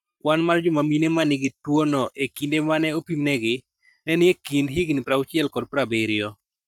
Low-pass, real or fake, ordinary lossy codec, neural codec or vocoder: 19.8 kHz; fake; none; codec, 44.1 kHz, 7.8 kbps, Pupu-Codec